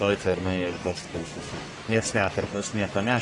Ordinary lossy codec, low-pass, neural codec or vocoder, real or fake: AAC, 32 kbps; 10.8 kHz; codec, 44.1 kHz, 1.7 kbps, Pupu-Codec; fake